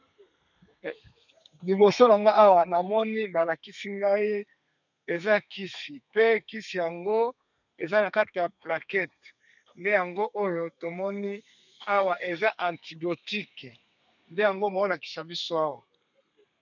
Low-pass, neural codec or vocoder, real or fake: 7.2 kHz; codec, 32 kHz, 1.9 kbps, SNAC; fake